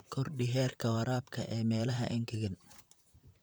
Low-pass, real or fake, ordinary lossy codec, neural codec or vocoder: none; fake; none; vocoder, 44.1 kHz, 128 mel bands, Pupu-Vocoder